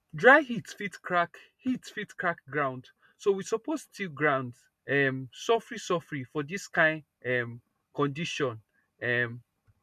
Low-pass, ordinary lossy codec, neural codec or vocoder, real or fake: 14.4 kHz; none; none; real